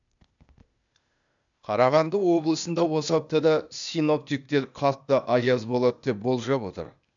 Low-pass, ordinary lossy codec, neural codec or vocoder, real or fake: 7.2 kHz; none; codec, 16 kHz, 0.8 kbps, ZipCodec; fake